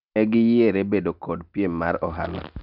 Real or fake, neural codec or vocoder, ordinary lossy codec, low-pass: real; none; none; 5.4 kHz